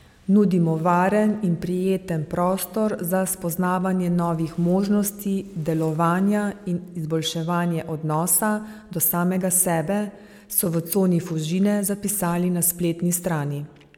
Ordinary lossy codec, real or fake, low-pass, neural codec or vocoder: MP3, 96 kbps; real; 19.8 kHz; none